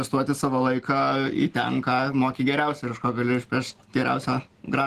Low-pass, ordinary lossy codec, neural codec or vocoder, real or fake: 14.4 kHz; Opus, 16 kbps; none; real